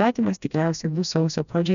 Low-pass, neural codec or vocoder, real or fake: 7.2 kHz; codec, 16 kHz, 1 kbps, FreqCodec, smaller model; fake